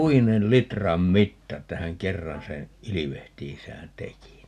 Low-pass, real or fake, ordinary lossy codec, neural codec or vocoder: 14.4 kHz; real; none; none